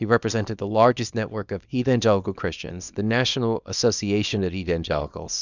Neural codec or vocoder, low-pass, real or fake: codec, 24 kHz, 0.9 kbps, WavTokenizer, small release; 7.2 kHz; fake